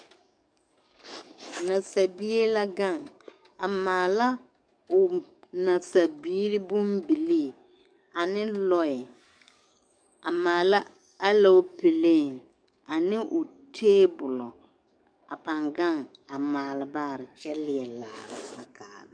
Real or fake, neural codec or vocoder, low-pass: fake; codec, 44.1 kHz, 7.8 kbps, DAC; 9.9 kHz